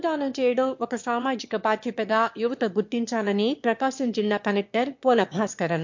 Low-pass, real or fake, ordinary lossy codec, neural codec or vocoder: 7.2 kHz; fake; MP3, 48 kbps; autoencoder, 22.05 kHz, a latent of 192 numbers a frame, VITS, trained on one speaker